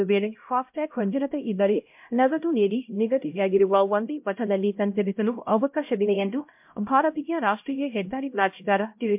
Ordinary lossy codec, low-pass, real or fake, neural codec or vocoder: MP3, 32 kbps; 3.6 kHz; fake; codec, 16 kHz, 0.5 kbps, X-Codec, HuBERT features, trained on LibriSpeech